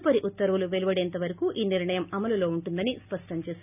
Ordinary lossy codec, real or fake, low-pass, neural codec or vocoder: none; real; 3.6 kHz; none